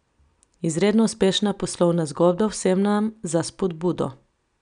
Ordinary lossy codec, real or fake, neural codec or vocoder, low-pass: none; real; none; 9.9 kHz